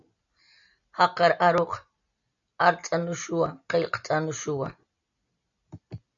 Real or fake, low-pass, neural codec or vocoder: real; 7.2 kHz; none